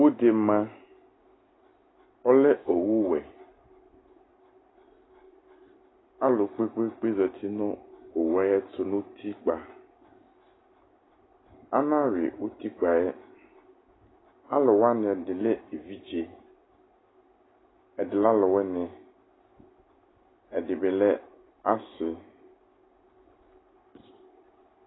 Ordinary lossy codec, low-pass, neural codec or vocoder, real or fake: AAC, 16 kbps; 7.2 kHz; none; real